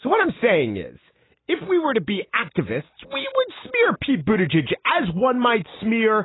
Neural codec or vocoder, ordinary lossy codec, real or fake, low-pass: none; AAC, 16 kbps; real; 7.2 kHz